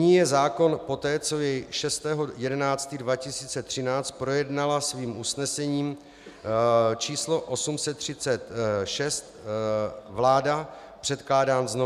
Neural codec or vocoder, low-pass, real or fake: none; 14.4 kHz; real